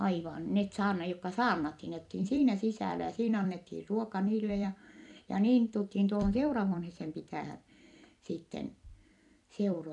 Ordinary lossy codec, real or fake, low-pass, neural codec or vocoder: none; fake; 10.8 kHz; vocoder, 44.1 kHz, 128 mel bands every 256 samples, BigVGAN v2